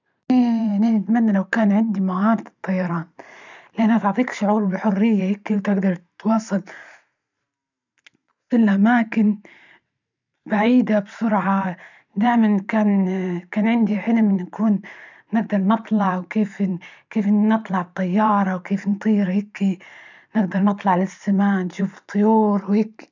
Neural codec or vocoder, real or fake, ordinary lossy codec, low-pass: vocoder, 44.1 kHz, 128 mel bands every 512 samples, BigVGAN v2; fake; none; 7.2 kHz